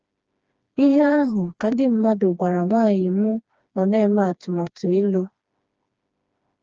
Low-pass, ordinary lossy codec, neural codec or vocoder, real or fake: 7.2 kHz; Opus, 24 kbps; codec, 16 kHz, 2 kbps, FreqCodec, smaller model; fake